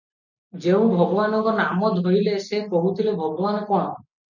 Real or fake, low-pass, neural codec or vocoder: real; 7.2 kHz; none